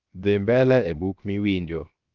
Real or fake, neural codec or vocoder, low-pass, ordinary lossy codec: fake; codec, 16 kHz, 0.7 kbps, FocalCodec; 7.2 kHz; Opus, 32 kbps